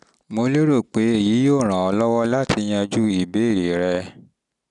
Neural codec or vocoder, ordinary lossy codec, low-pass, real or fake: none; none; 10.8 kHz; real